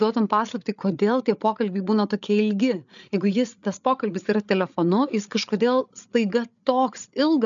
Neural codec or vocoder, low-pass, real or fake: codec, 16 kHz, 8 kbps, FreqCodec, larger model; 7.2 kHz; fake